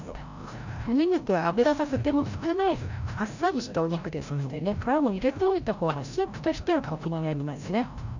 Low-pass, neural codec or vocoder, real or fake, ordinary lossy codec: 7.2 kHz; codec, 16 kHz, 0.5 kbps, FreqCodec, larger model; fake; none